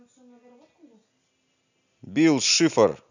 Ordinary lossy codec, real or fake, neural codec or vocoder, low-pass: none; real; none; 7.2 kHz